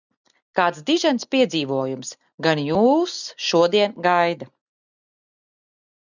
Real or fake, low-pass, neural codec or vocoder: real; 7.2 kHz; none